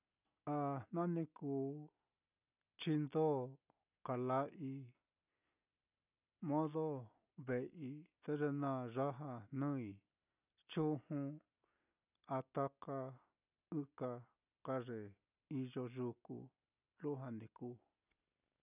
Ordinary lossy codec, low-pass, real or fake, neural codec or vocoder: none; 3.6 kHz; real; none